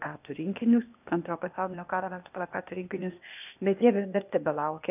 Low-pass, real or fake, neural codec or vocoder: 3.6 kHz; fake; codec, 16 kHz, 0.8 kbps, ZipCodec